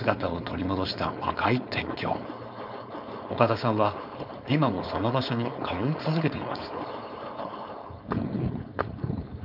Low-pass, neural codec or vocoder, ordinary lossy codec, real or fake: 5.4 kHz; codec, 16 kHz, 4.8 kbps, FACodec; none; fake